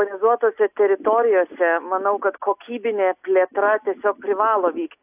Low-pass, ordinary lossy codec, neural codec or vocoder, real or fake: 3.6 kHz; AAC, 32 kbps; none; real